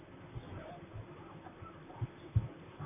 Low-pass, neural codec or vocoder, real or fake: 3.6 kHz; vocoder, 44.1 kHz, 128 mel bands, Pupu-Vocoder; fake